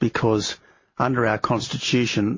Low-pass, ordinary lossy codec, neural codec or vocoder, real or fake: 7.2 kHz; MP3, 32 kbps; none; real